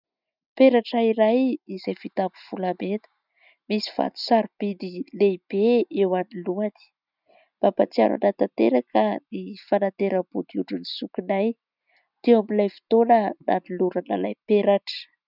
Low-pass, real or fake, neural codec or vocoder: 5.4 kHz; real; none